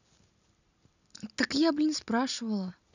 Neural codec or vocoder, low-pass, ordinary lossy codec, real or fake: none; 7.2 kHz; none; real